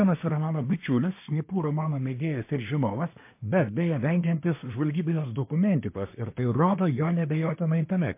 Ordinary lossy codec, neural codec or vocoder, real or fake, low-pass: MP3, 24 kbps; codec, 24 kHz, 1 kbps, SNAC; fake; 3.6 kHz